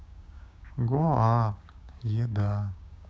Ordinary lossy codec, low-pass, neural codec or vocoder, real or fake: none; none; codec, 16 kHz, 6 kbps, DAC; fake